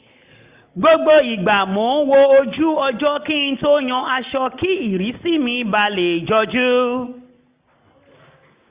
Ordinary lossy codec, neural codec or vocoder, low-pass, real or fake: Opus, 64 kbps; none; 3.6 kHz; real